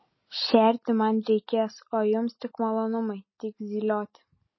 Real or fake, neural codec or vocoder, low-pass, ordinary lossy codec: real; none; 7.2 kHz; MP3, 24 kbps